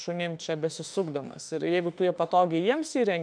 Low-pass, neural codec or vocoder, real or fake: 9.9 kHz; autoencoder, 48 kHz, 32 numbers a frame, DAC-VAE, trained on Japanese speech; fake